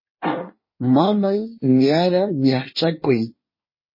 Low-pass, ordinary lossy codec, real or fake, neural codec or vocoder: 5.4 kHz; MP3, 24 kbps; fake; codec, 24 kHz, 1 kbps, SNAC